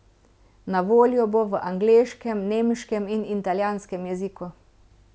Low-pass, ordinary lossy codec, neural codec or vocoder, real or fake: none; none; none; real